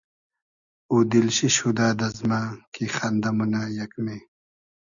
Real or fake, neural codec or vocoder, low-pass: real; none; 7.2 kHz